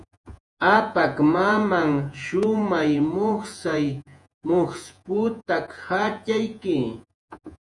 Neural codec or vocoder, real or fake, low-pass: vocoder, 48 kHz, 128 mel bands, Vocos; fake; 10.8 kHz